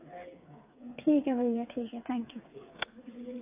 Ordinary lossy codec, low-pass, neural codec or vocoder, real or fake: AAC, 24 kbps; 3.6 kHz; codec, 16 kHz, 8 kbps, FreqCodec, smaller model; fake